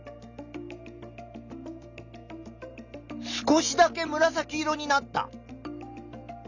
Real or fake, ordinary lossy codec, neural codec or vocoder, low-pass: real; none; none; 7.2 kHz